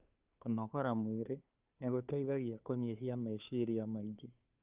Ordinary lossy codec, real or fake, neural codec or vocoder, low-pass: Opus, 24 kbps; fake; codec, 16 kHz, 2 kbps, FunCodec, trained on Chinese and English, 25 frames a second; 3.6 kHz